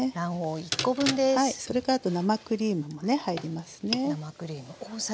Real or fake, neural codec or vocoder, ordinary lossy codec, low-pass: real; none; none; none